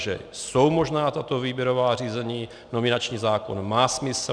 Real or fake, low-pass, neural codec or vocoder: real; 9.9 kHz; none